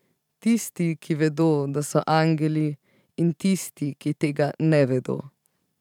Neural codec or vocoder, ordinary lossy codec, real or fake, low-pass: vocoder, 44.1 kHz, 128 mel bands every 512 samples, BigVGAN v2; none; fake; 19.8 kHz